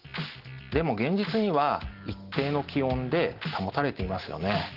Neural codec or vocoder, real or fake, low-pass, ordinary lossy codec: none; real; 5.4 kHz; Opus, 16 kbps